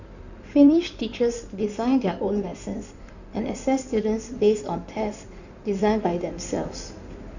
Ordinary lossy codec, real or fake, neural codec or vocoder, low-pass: none; fake; codec, 16 kHz in and 24 kHz out, 2.2 kbps, FireRedTTS-2 codec; 7.2 kHz